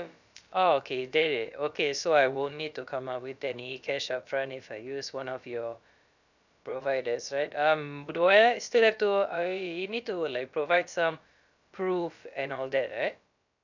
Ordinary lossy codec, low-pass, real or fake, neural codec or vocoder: none; 7.2 kHz; fake; codec, 16 kHz, about 1 kbps, DyCAST, with the encoder's durations